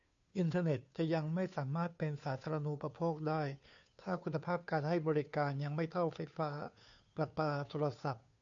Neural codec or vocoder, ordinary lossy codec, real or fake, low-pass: codec, 16 kHz, 2 kbps, FunCodec, trained on Chinese and English, 25 frames a second; AAC, 64 kbps; fake; 7.2 kHz